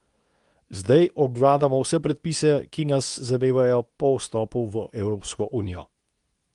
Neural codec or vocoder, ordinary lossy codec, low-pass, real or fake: codec, 24 kHz, 0.9 kbps, WavTokenizer, medium speech release version 2; Opus, 32 kbps; 10.8 kHz; fake